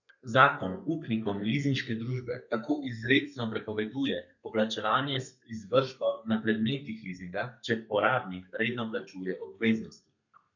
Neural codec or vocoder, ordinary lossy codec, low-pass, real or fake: codec, 32 kHz, 1.9 kbps, SNAC; none; 7.2 kHz; fake